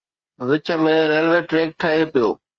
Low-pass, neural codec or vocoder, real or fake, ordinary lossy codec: 7.2 kHz; codec, 16 kHz, 8 kbps, FreqCodec, smaller model; fake; Opus, 24 kbps